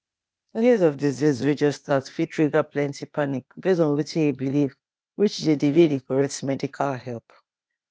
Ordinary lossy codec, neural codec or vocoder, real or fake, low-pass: none; codec, 16 kHz, 0.8 kbps, ZipCodec; fake; none